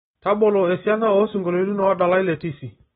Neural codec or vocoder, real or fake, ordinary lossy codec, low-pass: none; real; AAC, 16 kbps; 19.8 kHz